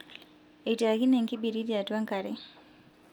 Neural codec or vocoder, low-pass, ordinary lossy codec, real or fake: none; 19.8 kHz; none; real